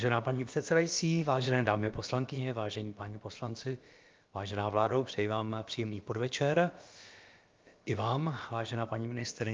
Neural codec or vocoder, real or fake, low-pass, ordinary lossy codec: codec, 16 kHz, about 1 kbps, DyCAST, with the encoder's durations; fake; 7.2 kHz; Opus, 32 kbps